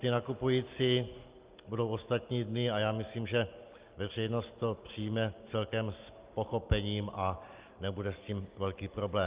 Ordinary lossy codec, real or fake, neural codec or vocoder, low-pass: Opus, 32 kbps; real; none; 3.6 kHz